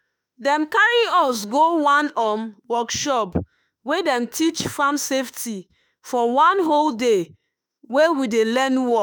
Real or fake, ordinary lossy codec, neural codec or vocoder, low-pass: fake; none; autoencoder, 48 kHz, 32 numbers a frame, DAC-VAE, trained on Japanese speech; none